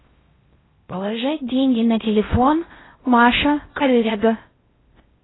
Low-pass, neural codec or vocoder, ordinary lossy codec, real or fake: 7.2 kHz; codec, 16 kHz in and 24 kHz out, 0.6 kbps, FocalCodec, streaming, 4096 codes; AAC, 16 kbps; fake